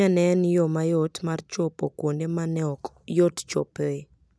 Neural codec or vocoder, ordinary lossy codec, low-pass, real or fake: none; none; none; real